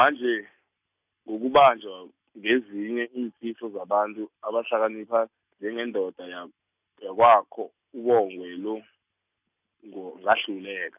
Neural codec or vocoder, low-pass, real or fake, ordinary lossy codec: none; 3.6 kHz; real; none